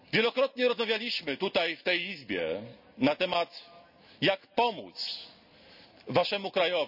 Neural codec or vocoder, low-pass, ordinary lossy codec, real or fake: none; 5.4 kHz; none; real